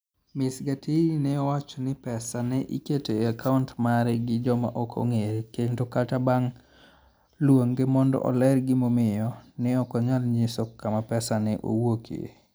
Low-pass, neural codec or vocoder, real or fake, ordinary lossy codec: none; none; real; none